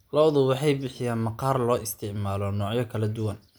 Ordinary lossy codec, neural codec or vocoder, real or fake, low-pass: none; none; real; none